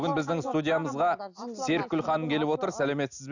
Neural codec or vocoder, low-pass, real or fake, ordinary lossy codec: none; 7.2 kHz; real; none